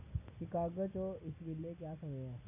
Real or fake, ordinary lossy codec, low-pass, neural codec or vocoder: real; none; 3.6 kHz; none